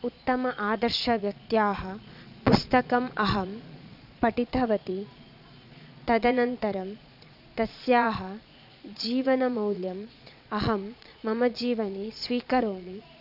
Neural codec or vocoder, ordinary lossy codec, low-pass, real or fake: vocoder, 22.05 kHz, 80 mel bands, Vocos; none; 5.4 kHz; fake